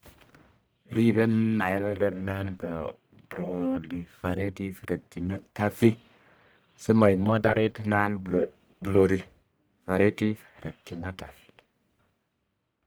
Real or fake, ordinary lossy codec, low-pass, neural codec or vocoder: fake; none; none; codec, 44.1 kHz, 1.7 kbps, Pupu-Codec